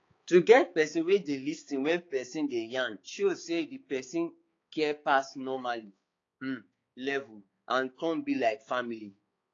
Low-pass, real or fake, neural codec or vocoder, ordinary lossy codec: 7.2 kHz; fake; codec, 16 kHz, 4 kbps, X-Codec, HuBERT features, trained on balanced general audio; AAC, 32 kbps